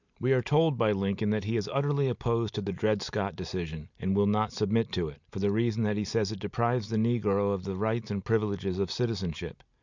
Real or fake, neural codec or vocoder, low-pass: real; none; 7.2 kHz